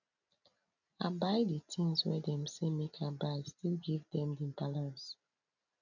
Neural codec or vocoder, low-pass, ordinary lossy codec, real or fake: none; 7.2 kHz; none; real